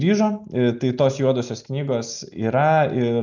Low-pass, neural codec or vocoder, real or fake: 7.2 kHz; none; real